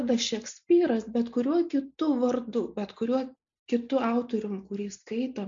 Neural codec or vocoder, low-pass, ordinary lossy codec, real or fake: none; 7.2 kHz; MP3, 48 kbps; real